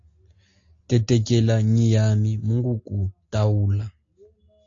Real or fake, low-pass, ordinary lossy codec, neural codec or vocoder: real; 7.2 kHz; AAC, 48 kbps; none